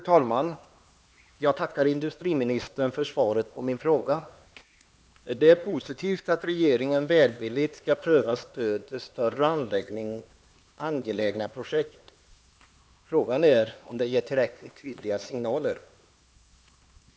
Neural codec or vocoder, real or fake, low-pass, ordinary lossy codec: codec, 16 kHz, 2 kbps, X-Codec, HuBERT features, trained on LibriSpeech; fake; none; none